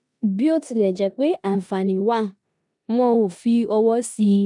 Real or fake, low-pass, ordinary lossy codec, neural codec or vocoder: fake; 10.8 kHz; none; codec, 16 kHz in and 24 kHz out, 0.9 kbps, LongCat-Audio-Codec, four codebook decoder